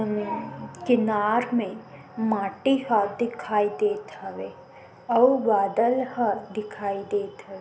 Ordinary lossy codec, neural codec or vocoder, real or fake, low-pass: none; none; real; none